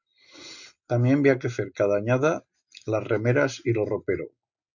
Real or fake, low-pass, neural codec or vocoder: real; 7.2 kHz; none